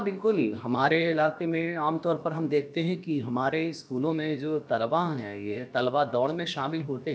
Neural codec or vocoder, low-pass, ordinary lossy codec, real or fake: codec, 16 kHz, about 1 kbps, DyCAST, with the encoder's durations; none; none; fake